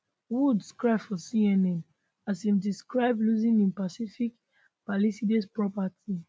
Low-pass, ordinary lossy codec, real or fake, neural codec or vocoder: none; none; real; none